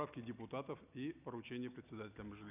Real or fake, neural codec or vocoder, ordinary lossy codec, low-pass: real; none; none; 3.6 kHz